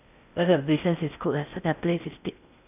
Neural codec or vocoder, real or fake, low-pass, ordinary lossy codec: codec, 16 kHz in and 24 kHz out, 0.6 kbps, FocalCodec, streaming, 4096 codes; fake; 3.6 kHz; none